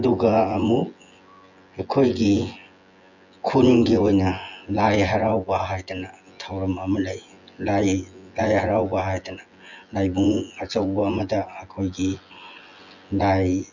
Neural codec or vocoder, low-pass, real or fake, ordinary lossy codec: vocoder, 24 kHz, 100 mel bands, Vocos; 7.2 kHz; fake; none